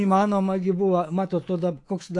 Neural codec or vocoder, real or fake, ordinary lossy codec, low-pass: codec, 24 kHz, 3.1 kbps, DualCodec; fake; AAC, 48 kbps; 10.8 kHz